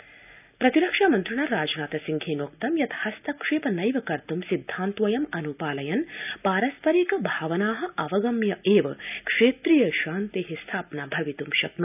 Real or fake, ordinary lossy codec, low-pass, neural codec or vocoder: real; none; 3.6 kHz; none